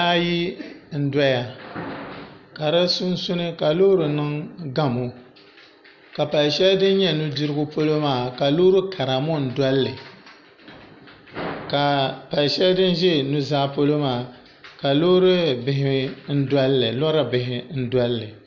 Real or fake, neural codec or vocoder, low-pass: real; none; 7.2 kHz